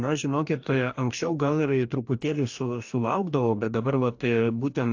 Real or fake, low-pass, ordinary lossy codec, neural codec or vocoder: fake; 7.2 kHz; AAC, 48 kbps; codec, 44.1 kHz, 2.6 kbps, DAC